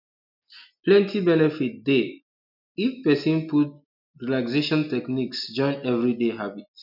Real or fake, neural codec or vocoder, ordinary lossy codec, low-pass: real; none; none; 5.4 kHz